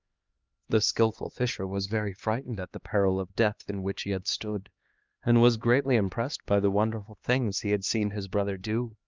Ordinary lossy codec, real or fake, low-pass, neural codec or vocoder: Opus, 24 kbps; fake; 7.2 kHz; codec, 16 kHz, 2 kbps, X-Codec, HuBERT features, trained on LibriSpeech